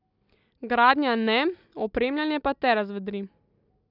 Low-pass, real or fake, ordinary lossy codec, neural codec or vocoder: 5.4 kHz; real; none; none